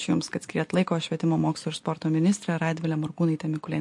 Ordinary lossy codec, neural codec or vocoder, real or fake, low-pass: MP3, 48 kbps; none; real; 10.8 kHz